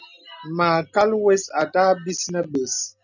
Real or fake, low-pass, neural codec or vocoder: real; 7.2 kHz; none